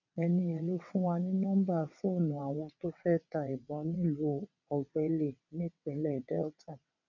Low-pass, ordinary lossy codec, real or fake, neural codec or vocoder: 7.2 kHz; none; fake; vocoder, 22.05 kHz, 80 mel bands, WaveNeXt